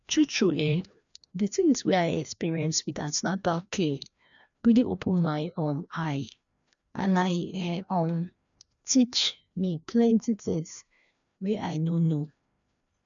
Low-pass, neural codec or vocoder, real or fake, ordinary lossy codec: 7.2 kHz; codec, 16 kHz, 1 kbps, FreqCodec, larger model; fake; MP3, 96 kbps